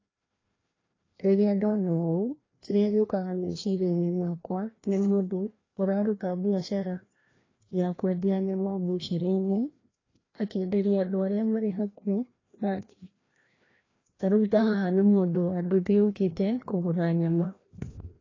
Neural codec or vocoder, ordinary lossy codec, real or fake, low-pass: codec, 16 kHz, 1 kbps, FreqCodec, larger model; AAC, 32 kbps; fake; 7.2 kHz